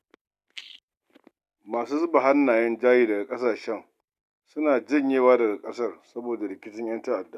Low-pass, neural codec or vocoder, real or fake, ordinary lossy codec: 14.4 kHz; none; real; none